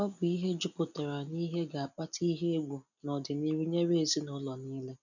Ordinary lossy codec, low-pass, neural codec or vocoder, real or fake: none; 7.2 kHz; none; real